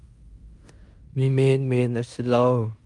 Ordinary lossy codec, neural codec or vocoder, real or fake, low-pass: Opus, 32 kbps; codec, 16 kHz in and 24 kHz out, 0.9 kbps, LongCat-Audio-Codec, fine tuned four codebook decoder; fake; 10.8 kHz